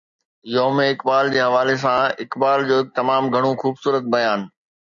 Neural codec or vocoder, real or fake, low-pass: none; real; 7.2 kHz